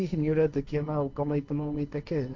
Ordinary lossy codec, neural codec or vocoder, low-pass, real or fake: none; codec, 16 kHz, 1.1 kbps, Voila-Tokenizer; none; fake